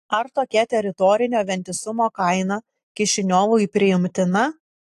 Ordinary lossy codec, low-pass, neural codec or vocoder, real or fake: AAC, 64 kbps; 14.4 kHz; none; real